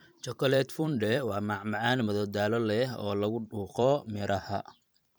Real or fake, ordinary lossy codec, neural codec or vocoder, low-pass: fake; none; vocoder, 44.1 kHz, 128 mel bands every 512 samples, BigVGAN v2; none